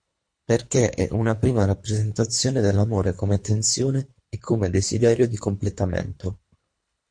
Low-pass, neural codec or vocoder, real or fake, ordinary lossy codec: 9.9 kHz; codec, 24 kHz, 3 kbps, HILCodec; fake; MP3, 48 kbps